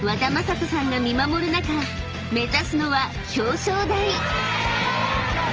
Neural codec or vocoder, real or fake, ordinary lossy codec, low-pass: none; real; Opus, 24 kbps; 7.2 kHz